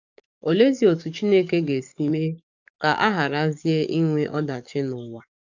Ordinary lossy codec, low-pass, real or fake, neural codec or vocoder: none; 7.2 kHz; fake; codec, 44.1 kHz, 7.8 kbps, DAC